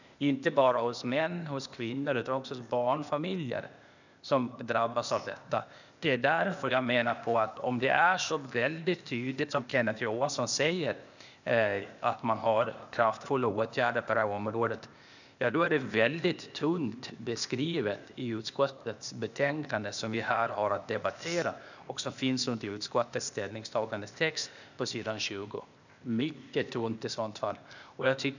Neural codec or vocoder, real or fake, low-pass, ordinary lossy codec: codec, 16 kHz, 0.8 kbps, ZipCodec; fake; 7.2 kHz; none